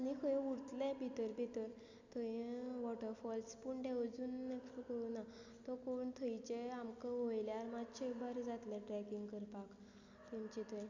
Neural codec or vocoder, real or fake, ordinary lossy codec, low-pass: none; real; none; 7.2 kHz